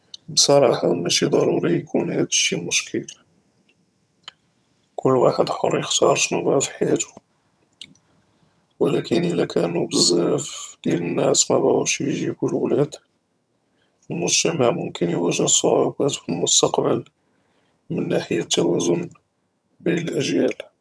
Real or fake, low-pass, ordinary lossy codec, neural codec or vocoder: fake; none; none; vocoder, 22.05 kHz, 80 mel bands, HiFi-GAN